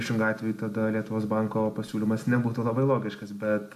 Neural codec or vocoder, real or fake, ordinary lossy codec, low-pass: none; real; MP3, 64 kbps; 14.4 kHz